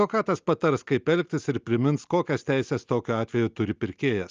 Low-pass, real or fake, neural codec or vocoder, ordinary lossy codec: 7.2 kHz; real; none; Opus, 24 kbps